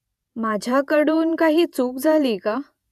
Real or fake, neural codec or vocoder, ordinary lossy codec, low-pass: fake; vocoder, 48 kHz, 128 mel bands, Vocos; none; 14.4 kHz